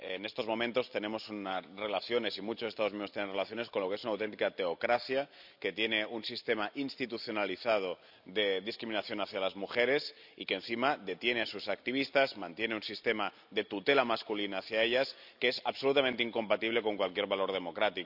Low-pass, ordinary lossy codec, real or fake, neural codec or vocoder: 5.4 kHz; none; real; none